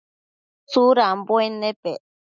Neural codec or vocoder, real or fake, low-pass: none; real; 7.2 kHz